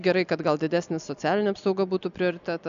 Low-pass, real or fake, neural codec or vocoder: 7.2 kHz; real; none